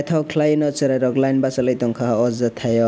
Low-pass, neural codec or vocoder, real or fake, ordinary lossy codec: none; none; real; none